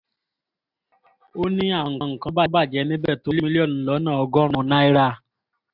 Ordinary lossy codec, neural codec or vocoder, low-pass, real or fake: AAC, 48 kbps; none; 5.4 kHz; real